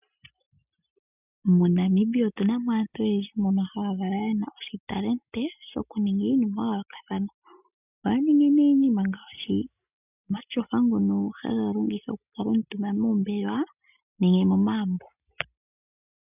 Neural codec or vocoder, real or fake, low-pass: none; real; 3.6 kHz